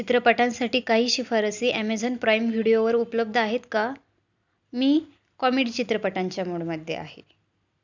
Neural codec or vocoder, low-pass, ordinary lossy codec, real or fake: none; 7.2 kHz; none; real